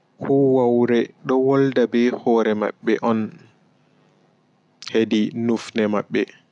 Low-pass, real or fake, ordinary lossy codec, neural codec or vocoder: 10.8 kHz; real; none; none